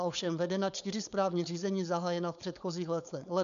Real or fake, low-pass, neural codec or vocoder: fake; 7.2 kHz; codec, 16 kHz, 4.8 kbps, FACodec